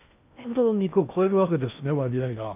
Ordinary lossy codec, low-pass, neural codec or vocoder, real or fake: MP3, 32 kbps; 3.6 kHz; codec, 16 kHz in and 24 kHz out, 0.6 kbps, FocalCodec, streaming, 2048 codes; fake